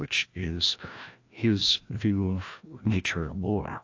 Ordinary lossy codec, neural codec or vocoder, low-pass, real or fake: AAC, 48 kbps; codec, 16 kHz, 1 kbps, FreqCodec, larger model; 7.2 kHz; fake